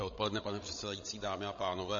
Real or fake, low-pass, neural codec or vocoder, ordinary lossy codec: fake; 7.2 kHz; codec, 16 kHz, 16 kbps, FreqCodec, larger model; MP3, 32 kbps